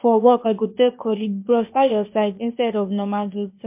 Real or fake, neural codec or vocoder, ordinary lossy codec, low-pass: fake; codec, 16 kHz, 0.8 kbps, ZipCodec; MP3, 32 kbps; 3.6 kHz